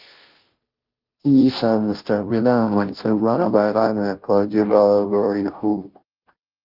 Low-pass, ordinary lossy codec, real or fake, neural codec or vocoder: 5.4 kHz; Opus, 32 kbps; fake; codec, 16 kHz, 0.5 kbps, FunCodec, trained on Chinese and English, 25 frames a second